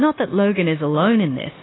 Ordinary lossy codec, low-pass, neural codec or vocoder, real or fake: AAC, 16 kbps; 7.2 kHz; codec, 16 kHz, 0.9 kbps, LongCat-Audio-Codec; fake